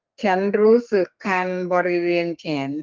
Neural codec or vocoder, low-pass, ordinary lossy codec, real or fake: codec, 32 kHz, 1.9 kbps, SNAC; 7.2 kHz; Opus, 24 kbps; fake